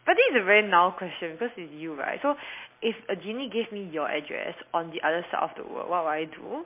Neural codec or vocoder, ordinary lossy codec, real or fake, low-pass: none; MP3, 24 kbps; real; 3.6 kHz